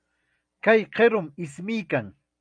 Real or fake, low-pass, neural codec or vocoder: real; 9.9 kHz; none